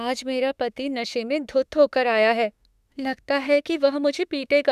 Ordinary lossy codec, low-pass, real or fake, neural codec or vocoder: none; 14.4 kHz; fake; autoencoder, 48 kHz, 32 numbers a frame, DAC-VAE, trained on Japanese speech